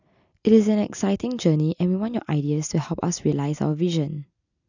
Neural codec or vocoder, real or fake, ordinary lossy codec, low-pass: none; real; none; 7.2 kHz